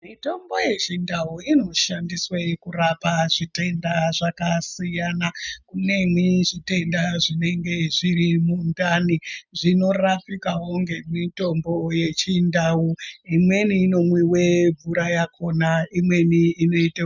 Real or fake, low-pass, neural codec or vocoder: real; 7.2 kHz; none